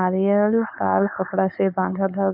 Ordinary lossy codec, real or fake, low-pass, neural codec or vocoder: none; fake; 5.4 kHz; codec, 16 kHz, 4.8 kbps, FACodec